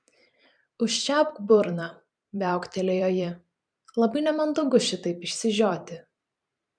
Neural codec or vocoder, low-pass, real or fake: vocoder, 44.1 kHz, 128 mel bands every 512 samples, BigVGAN v2; 9.9 kHz; fake